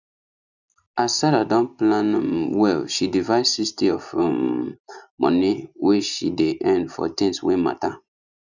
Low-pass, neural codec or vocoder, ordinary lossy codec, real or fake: 7.2 kHz; none; none; real